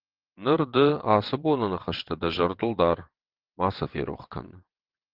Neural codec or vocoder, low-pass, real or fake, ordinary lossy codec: vocoder, 22.05 kHz, 80 mel bands, WaveNeXt; 5.4 kHz; fake; Opus, 16 kbps